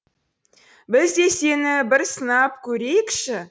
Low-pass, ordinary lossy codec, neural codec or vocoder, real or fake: none; none; none; real